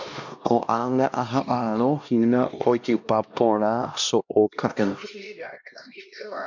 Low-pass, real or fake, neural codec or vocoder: 7.2 kHz; fake; codec, 16 kHz, 1 kbps, X-Codec, HuBERT features, trained on LibriSpeech